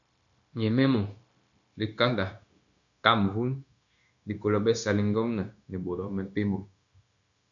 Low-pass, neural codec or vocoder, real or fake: 7.2 kHz; codec, 16 kHz, 0.9 kbps, LongCat-Audio-Codec; fake